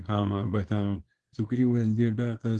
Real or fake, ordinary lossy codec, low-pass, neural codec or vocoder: fake; Opus, 16 kbps; 10.8 kHz; codec, 24 kHz, 0.9 kbps, WavTokenizer, small release